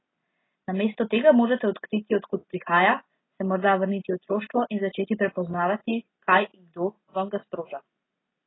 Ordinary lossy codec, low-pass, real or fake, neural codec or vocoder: AAC, 16 kbps; 7.2 kHz; real; none